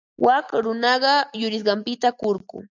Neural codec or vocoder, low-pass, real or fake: none; 7.2 kHz; real